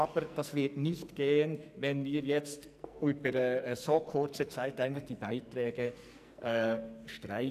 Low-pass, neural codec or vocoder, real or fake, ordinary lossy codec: 14.4 kHz; codec, 44.1 kHz, 2.6 kbps, SNAC; fake; none